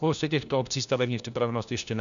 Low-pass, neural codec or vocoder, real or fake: 7.2 kHz; codec, 16 kHz, 1 kbps, FunCodec, trained on LibriTTS, 50 frames a second; fake